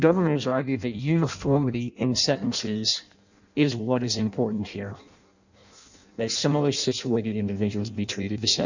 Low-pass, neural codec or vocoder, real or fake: 7.2 kHz; codec, 16 kHz in and 24 kHz out, 0.6 kbps, FireRedTTS-2 codec; fake